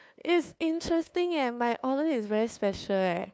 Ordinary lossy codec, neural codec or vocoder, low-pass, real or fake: none; codec, 16 kHz, 2 kbps, FunCodec, trained on LibriTTS, 25 frames a second; none; fake